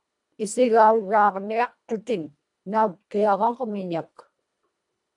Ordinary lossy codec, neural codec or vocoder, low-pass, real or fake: AAC, 64 kbps; codec, 24 kHz, 1.5 kbps, HILCodec; 10.8 kHz; fake